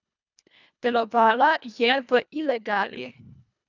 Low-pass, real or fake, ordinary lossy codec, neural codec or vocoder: 7.2 kHz; fake; none; codec, 24 kHz, 1.5 kbps, HILCodec